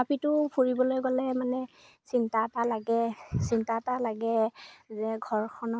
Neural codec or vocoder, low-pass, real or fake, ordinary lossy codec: none; none; real; none